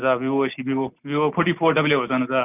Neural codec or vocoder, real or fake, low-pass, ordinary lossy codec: none; real; 3.6 kHz; none